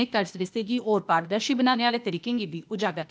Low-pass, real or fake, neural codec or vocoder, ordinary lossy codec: none; fake; codec, 16 kHz, 0.8 kbps, ZipCodec; none